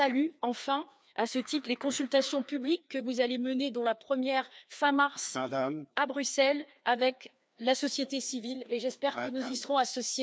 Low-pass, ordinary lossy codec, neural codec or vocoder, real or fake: none; none; codec, 16 kHz, 2 kbps, FreqCodec, larger model; fake